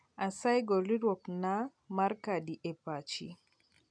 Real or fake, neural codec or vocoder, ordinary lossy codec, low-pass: real; none; none; 9.9 kHz